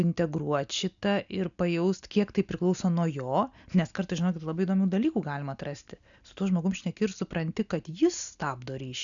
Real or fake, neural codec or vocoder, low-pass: real; none; 7.2 kHz